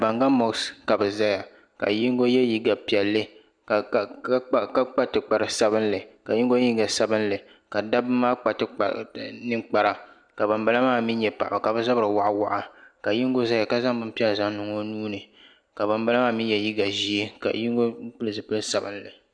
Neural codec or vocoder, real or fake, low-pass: none; real; 9.9 kHz